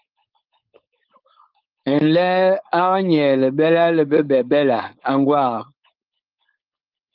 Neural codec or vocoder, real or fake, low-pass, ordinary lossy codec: codec, 16 kHz, 4.8 kbps, FACodec; fake; 5.4 kHz; Opus, 24 kbps